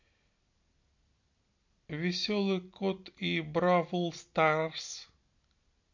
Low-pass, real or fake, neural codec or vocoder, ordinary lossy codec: 7.2 kHz; real; none; MP3, 48 kbps